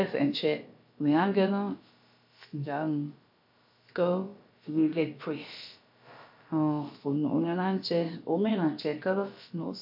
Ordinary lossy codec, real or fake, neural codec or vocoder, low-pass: MP3, 48 kbps; fake; codec, 16 kHz, about 1 kbps, DyCAST, with the encoder's durations; 5.4 kHz